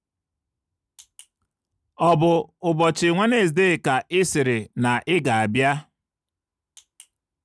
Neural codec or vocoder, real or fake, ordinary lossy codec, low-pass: none; real; none; none